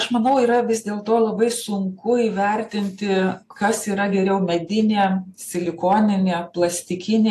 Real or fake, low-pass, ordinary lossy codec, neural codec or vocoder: real; 14.4 kHz; AAC, 64 kbps; none